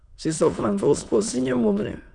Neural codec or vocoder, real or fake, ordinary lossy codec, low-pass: autoencoder, 22.05 kHz, a latent of 192 numbers a frame, VITS, trained on many speakers; fake; none; 9.9 kHz